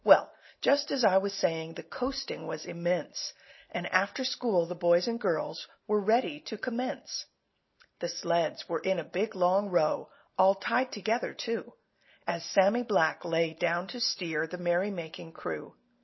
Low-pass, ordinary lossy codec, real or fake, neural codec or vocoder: 7.2 kHz; MP3, 24 kbps; real; none